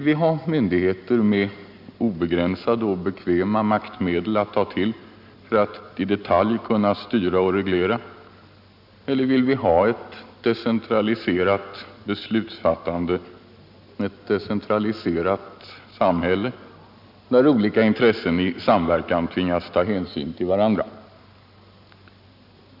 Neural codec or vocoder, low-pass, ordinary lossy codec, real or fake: none; 5.4 kHz; none; real